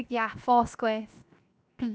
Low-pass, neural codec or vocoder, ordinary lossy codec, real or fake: none; codec, 16 kHz, 0.7 kbps, FocalCodec; none; fake